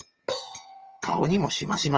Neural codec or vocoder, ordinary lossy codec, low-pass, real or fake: vocoder, 22.05 kHz, 80 mel bands, HiFi-GAN; Opus, 24 kbps; 7.2 kHz; fake